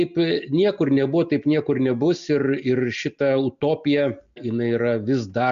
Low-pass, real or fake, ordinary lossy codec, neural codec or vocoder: 7.2 kHz; real; AAC, 96 kbps; none